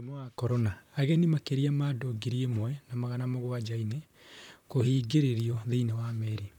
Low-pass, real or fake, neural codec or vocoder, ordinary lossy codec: 19.8 kHz; fake; vocoder, 44.1 kHz, 128 mel bands, Pupu-Vocoder; none